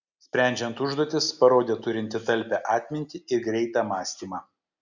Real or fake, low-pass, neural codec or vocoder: real; 7.2 kHz; none